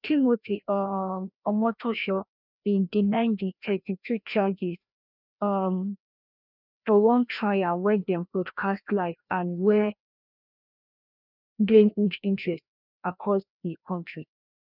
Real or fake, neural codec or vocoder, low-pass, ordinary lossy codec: fake; codec, 16 kHz, 1 kbps, FreqCodec, larger model; 5.4 kHz; none